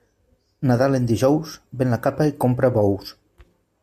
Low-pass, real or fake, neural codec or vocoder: 14.4 kHz; real; none